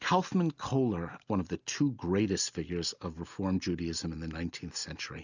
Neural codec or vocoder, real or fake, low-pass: none; real; 7.2 kHz